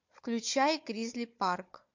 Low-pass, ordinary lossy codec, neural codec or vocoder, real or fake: 7.2 kHz; MP3, 48 kbps; codec, 16 kHz, 4 kbps, FunCodec, trained on Chinese and English, 50 frames a second; fake